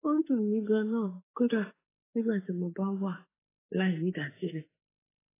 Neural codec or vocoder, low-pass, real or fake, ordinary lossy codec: autoencoder, 48 kHz, 32 numbers a frame, DAC-VAE, trained on Japanese speech; 3.6 kHz; fake; AAC, 16 kbps